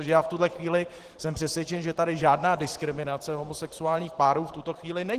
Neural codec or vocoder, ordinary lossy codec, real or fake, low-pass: vocoder, 44.1 kHz, 128 mel bands every 512 samples, BigVGAN v2; Opus, 16 kbps; fake; 14.4 kHz